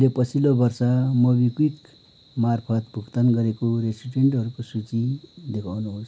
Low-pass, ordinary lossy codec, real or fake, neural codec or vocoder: none; none; real; none